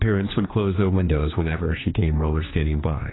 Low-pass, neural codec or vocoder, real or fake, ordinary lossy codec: 7.2 kHz; codec, 16 kHz, 2 kbps, X-Codec, HuBERT features, trained on general audio; fake; AAC, 16 kbps